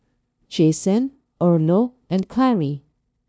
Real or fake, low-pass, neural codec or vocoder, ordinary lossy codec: fake; none; codec, 16 kHz, 0.5 kbps, FunCodec, trained on LibriTTS, 25 frames a second; none